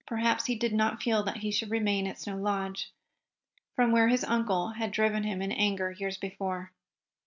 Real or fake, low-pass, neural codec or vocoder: real; 7.2 kHz; none